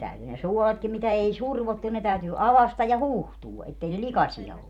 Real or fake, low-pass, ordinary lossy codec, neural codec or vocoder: fake; 19.8 kHz; none; vocoder, 44.1 kHz, 128 mel bands every 512 samples, BigVGAN v2